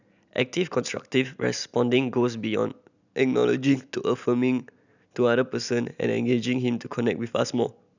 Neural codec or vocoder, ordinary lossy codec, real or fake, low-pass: none; none; real; 7.2 kHz